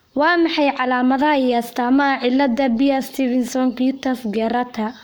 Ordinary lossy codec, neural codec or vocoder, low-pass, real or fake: none; codec, 44.1 kHz, 7.8 kbps, Pupu-Codec; none; fake